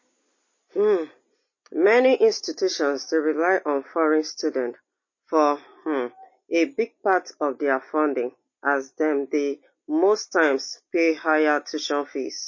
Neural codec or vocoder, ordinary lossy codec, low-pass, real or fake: none; MP3, 32 kbps; 7.2 kHz; real